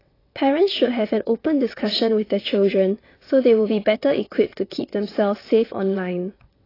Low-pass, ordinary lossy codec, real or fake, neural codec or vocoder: 5.4 kHz; AAC, 24 kbps; fake; vocoder, 22.05 kHz, 80 mel bands, WaveNeXt